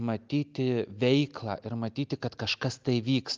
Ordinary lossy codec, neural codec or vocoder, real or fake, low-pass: Opus, 24 kbps; none; real; 7.2 kHz